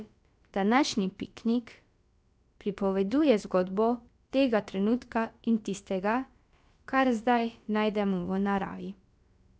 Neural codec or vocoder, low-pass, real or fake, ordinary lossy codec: codec, 16 kHz, about 1 kbps, DyCAST, with the encoder's durations; none; fake; none